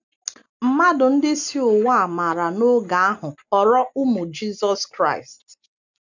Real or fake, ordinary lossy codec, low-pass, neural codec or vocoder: real; none; 7.2 kHz; none